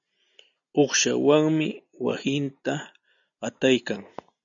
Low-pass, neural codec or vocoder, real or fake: 7.2 kHz; none; real